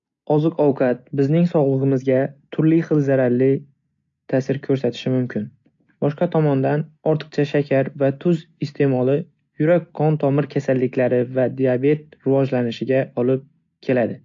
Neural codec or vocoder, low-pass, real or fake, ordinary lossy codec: none; 7.2 kHz; real; AAC, 64 kbps